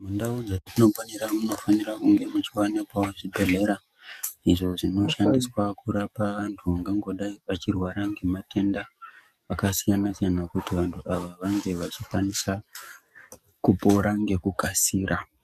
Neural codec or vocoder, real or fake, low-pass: codec, 44.1 kHz, 7.8 kbps, DAC; fake; 14.4 kHz